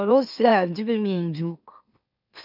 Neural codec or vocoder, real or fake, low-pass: autoencoder, 44.1 kHz, a latent of 192 numbers a frame, MeloTTS; fake; 5.4 kHz